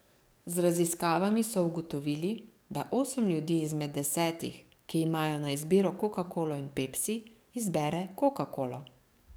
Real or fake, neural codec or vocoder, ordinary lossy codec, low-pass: fake; codec, 44.1 kHz, 7.8 kbps, DAC; none; none